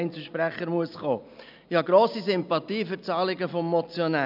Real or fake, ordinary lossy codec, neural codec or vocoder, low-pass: real; none; none; 5.4 kHz